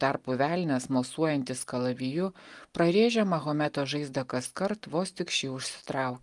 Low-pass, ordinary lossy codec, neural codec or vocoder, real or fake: 10.8 kHz; Opus, 32 kbps; none; real